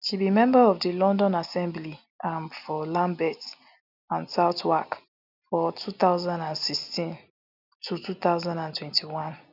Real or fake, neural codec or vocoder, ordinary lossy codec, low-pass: real; none; none; 5.4 kHz